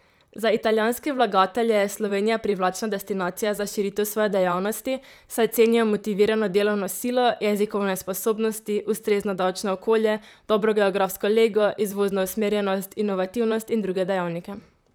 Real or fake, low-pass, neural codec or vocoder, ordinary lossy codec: fake; none; vocoder, 44.1 kHz, 128 mel bands, Pupu-Vocoder; none